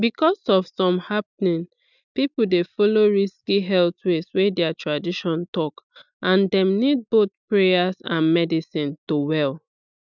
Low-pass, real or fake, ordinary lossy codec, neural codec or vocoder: 7.2 kHz; real; none; none